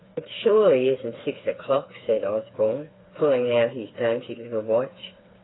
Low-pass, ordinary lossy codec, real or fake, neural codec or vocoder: 7.2 kHz; AAC, 16 kbps; fake; codec, 16 kHz, 4 kbps, FreqCodec, smaller model